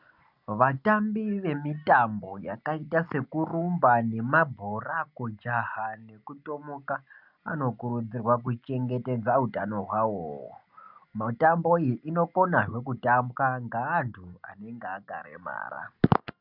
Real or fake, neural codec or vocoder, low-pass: real; none; 5.4 kHz